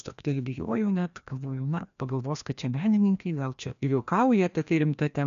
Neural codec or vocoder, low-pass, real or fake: codec, 16 kHz, 1 kbps, FreqCodec, larger model; 7.2 kHz; fake